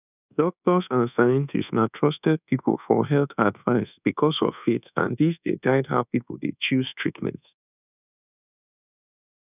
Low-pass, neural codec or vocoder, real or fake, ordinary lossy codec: 3.6 kHz; codec, 24 kHz, 1.2 kbps, DualCodec; fake; none